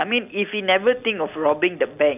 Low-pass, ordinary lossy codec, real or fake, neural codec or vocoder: 3.6 kHz; none; real; none